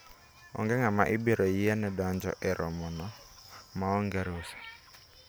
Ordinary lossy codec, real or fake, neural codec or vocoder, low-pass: none; real; none; none